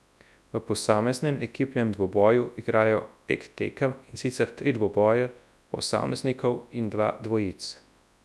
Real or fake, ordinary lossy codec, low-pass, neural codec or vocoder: fake; none; none; codec, 24 kHz, 0.9 kbps, WavTokenizer, large speech release